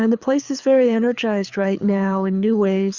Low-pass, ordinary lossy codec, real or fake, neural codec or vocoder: 7.2 kHz; Opus, 64 kbps; fake; codec, 24 kHz, 6 kbps, HILCodec